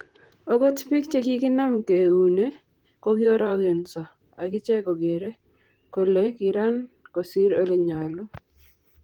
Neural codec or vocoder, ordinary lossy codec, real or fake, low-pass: vocoder, 44.1 kHz, 128 mel bands, Pupu-Vocoder; Opus, 24 kbps; fake; 19.8 kHz